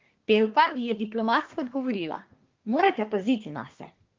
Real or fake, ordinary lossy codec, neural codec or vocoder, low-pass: fake; Opus, 32 kbps; codec, 24 kHz, 1 kbps, SNAC; 7.2 kHz